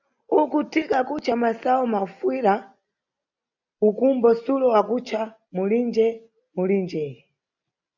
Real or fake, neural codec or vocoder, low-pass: real; none; 7.2 kHz